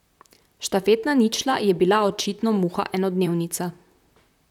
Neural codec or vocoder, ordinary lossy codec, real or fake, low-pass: vocoder, 44.1 kHz, 128 mel bands, Pupu-Vocoder; none; fake; 19.8 kHz